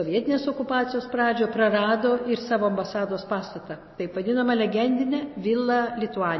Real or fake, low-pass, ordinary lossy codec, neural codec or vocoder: real; 7.2 kHz; MP3, 24 kbps; none